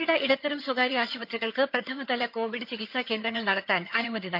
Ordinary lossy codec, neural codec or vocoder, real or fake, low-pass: MP3, 48 kbps; vocoder, 22.05 kHz, 80 mel bands, HiFi-GAN; fake; 5.4 kHz